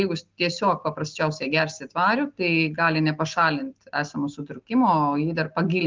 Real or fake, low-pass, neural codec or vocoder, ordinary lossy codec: real; 7.2 kHz; none; Opus, 32 kbps